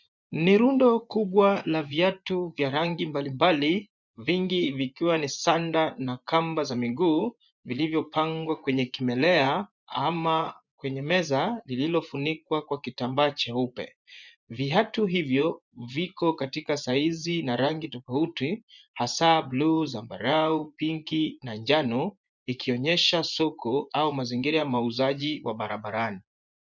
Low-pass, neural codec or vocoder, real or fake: 7.2 kHz; vocoder, 24 kHz, 100 mel bands, Vocos; fake